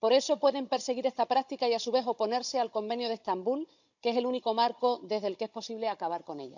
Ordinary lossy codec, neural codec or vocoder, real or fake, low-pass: none; codec, 16 kHz, 16 kbps, FunCodec, trained on Chinese and English, 50 frames a second; fake; 7.2 kHz